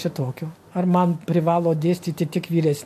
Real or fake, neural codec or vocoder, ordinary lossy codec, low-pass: real; none; MP3, 64 kbps; 14.4 kHz